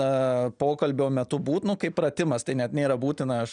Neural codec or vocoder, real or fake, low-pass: none; real; 9.9 kHz